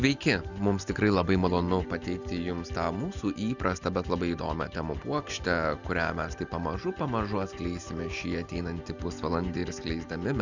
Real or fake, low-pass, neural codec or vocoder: real; 7.2 kHz; none